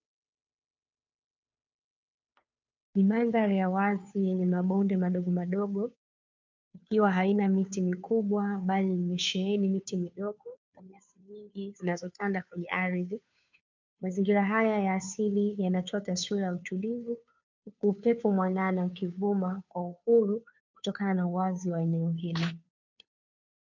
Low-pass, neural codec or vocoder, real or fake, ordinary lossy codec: 7.2 kHz; codec, 16 kHz, 2 kbps, FunCodec, trained on Chinese and English, 25 frames a second; fake; MP3, 64 kbps